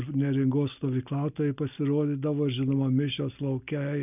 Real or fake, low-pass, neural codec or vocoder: real; 3.6 kHz; none